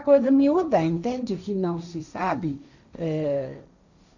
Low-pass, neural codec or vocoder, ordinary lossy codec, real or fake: 7.2 kHz; codec, 16 kHz, 1.1 kbps, Voila-Tokenizer; none; fake